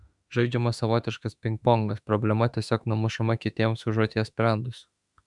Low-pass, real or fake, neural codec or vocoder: 10.8 kHz; fake; autoencoder, 48 kHz, 32 numbers a frame, DAC-VAE, trained on Japanese speech